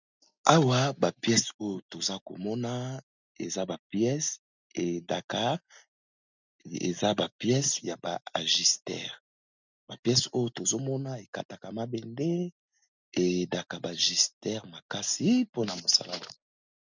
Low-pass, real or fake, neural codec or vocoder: 7.2 kHz; real; none